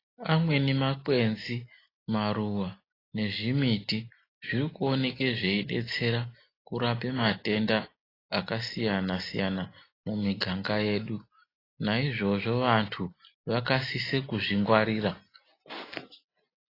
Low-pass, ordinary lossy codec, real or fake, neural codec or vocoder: 5.4 kHz; AAC, 24 kbps; real; none